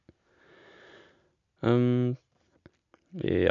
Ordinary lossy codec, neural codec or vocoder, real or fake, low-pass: none; none; real; 7.2 kHz